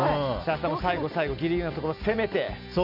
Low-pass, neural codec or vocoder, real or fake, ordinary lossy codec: 5.4 kHz; none; real; none